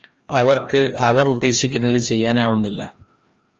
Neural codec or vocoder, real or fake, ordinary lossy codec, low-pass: codec, 16 kHz, 1 kbps, FreqCodec, larger model; fake; Opus, 24 kbps; 7.2 kHz